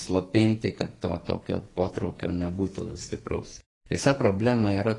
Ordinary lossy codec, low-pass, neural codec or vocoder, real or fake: AAC, 32 kbps; 10.8 kHz; codec, 44.1 kHz, 2.6 kbps, SNAC; fake